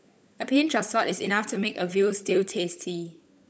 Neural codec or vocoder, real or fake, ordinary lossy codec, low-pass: codec, 16 kHz, 16 kbps, FunCodec, trained on LibriTTS, 50 frames a second; fake; none; none